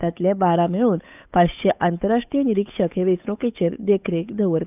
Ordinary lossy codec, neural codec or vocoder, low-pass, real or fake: none; codec, 16 kHz, 8 kbps, FunCodec, trained on Chinese and English, 25 frames a second; 3.6 kHz; fake